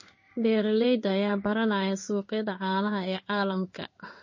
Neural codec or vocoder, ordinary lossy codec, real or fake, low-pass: codec, 16 kHz in and 24 kHz out, 1 kbps, XY-Tokenizer; MP3, 32 kbps; fake; 7.2 kHz